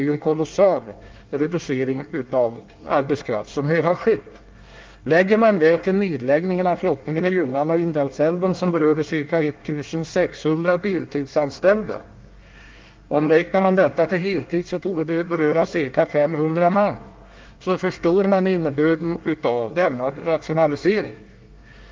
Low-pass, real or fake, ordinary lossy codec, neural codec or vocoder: 7.2 kHz; fake; Opus, 32 kbps; codec, 24 kHz, 1 kbps, SNAC